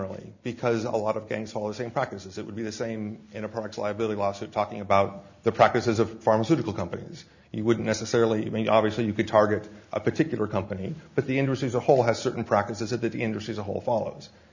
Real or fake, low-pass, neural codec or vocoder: real; 7.2 kHz; none